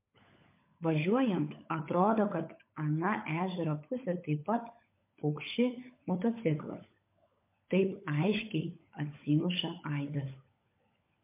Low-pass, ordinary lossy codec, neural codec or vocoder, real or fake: 3.6 kHz; MP3, 24 kbps; codec, 16 kHz, 16 kbps, FunCodec, trained on LibriTTS, 50 frames a second; fake